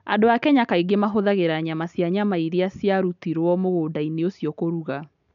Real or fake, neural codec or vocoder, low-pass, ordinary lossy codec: real; none; 7.2 kHz; none